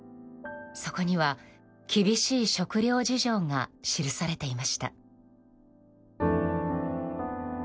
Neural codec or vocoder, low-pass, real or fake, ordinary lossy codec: none; none; real; none